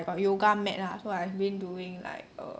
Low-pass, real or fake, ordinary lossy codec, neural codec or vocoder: none; real; none; none